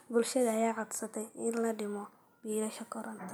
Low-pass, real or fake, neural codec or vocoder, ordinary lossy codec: none; real; none; none